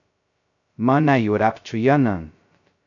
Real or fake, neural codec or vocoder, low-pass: fake; codec, 16 kHz, 0.2 kbps, FocalCodec; 7.2 kHz